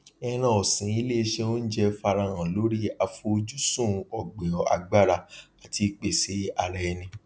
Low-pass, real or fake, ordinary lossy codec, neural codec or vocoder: none; real; none; none